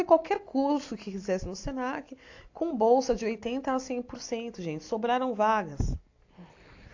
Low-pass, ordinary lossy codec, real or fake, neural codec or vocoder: 7.2 kHz; AAC, 48 kbps; real; none